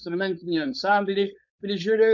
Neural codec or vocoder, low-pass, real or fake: codec, 16 kHz, 4.8 kbps, FACodec; 7.2 kHz; fake